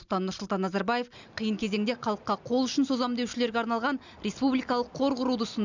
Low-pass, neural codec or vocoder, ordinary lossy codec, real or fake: 7.2 kHz; none; none; real